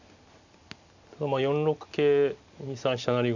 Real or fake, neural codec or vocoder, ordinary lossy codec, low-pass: real; none; none; 7.2 kHz